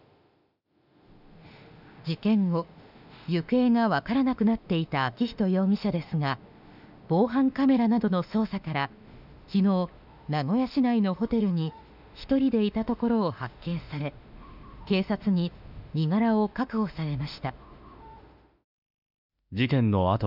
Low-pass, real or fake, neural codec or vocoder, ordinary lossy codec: 5.4 kHz; fake; autoencoder, 48 kHz, 32 numbers a frame, DAC-VAE, trained on Japanese speech; none